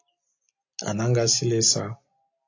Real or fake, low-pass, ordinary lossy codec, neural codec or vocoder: real; 7.2 kHz; AAC, 48 kbps; none